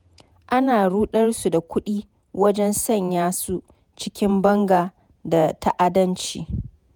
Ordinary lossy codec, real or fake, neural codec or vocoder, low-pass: none; fake; vocoder, 48 kHz, 128 mel bands, Vocos; none